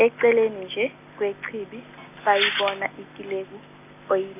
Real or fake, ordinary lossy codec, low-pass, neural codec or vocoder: real; none; 3.6 kHz; none